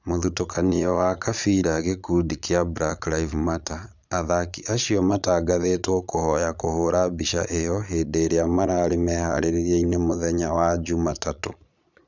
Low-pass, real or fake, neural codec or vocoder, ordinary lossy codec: 7.2 kHz; fake; vocoder, 22.05 kHz, 80 mel bands, Vocos; none